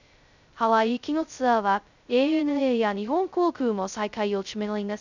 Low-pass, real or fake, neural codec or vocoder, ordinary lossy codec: 7.2 kHz; fake; codec, 16 kHz, 0.2 kbps, FocalCodec; none